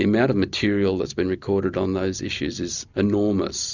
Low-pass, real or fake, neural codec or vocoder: 7.2 kHz; real; none